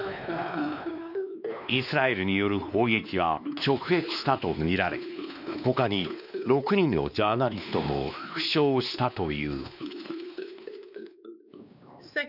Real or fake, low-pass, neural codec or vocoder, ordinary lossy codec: fake; 5.4 kHz; codec, 16 kHz, 2 kbps, X-Codec, WavLM features, trained on Multilingual LibriSpeech; none